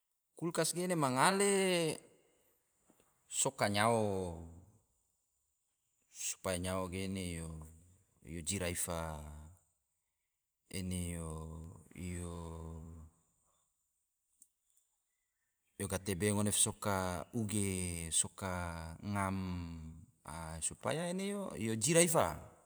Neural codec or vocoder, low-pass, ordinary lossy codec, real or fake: vocoder, 44.1 kHz, 128 mel bands every 512 samples, BigVGAN v2; none; none; fake